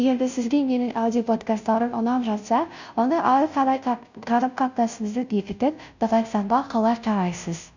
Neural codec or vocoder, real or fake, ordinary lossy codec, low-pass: codec, 16 kHz, 0.5 kbps, FunCodec, trained on Chinese and English, 25 frames a second; fake; none; 7.2 kHz